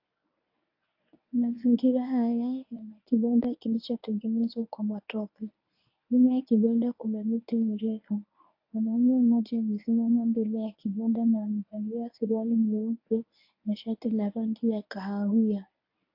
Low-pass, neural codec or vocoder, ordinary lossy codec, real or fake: 5.4 kHz; codec, 24 kHz, 0.9 kbps, WavTokenizer, medium speech release version 1; AAC, 48 kbps; fake